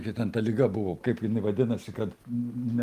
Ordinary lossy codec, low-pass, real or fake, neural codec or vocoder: Opus, 32 kbps; 14.4 kHz; real; none